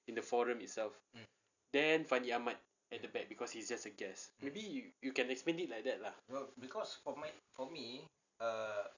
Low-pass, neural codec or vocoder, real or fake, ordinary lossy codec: 7.2 kHz; none; real; none